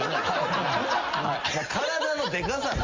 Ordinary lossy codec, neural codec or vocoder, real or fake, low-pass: Opus, 32 kbps; none; real; 7.2 kHz